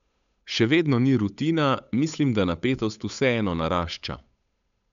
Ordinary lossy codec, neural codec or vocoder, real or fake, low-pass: none; codec, 16 kHz, 8 kbps, FunCodec, trained on Chinese and English, 25 frames a second; fake; 7.2 kHz